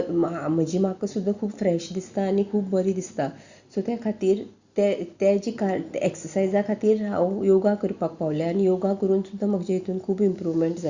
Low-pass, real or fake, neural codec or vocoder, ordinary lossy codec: 7.2 kHz; real; none; Opus, 64 kbps